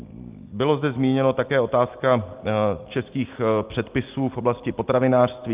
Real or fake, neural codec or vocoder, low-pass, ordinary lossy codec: real; none; 3.6 kHz; Opus, 32 kbps